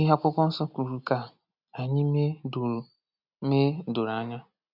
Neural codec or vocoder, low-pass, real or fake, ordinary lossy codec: none; 5.4 kHz; real; none